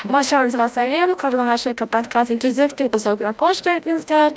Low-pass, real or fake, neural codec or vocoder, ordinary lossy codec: none; fake; codec, 16 kHz, 0.5 kbps, FreqCodec, larger model; none